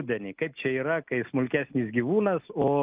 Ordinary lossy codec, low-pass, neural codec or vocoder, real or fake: Opus, 24 kbps; 3.6 kHz; none; real